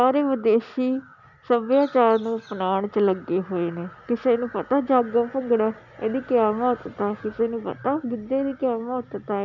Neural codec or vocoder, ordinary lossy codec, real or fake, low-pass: none; none; real; 7.2 kHz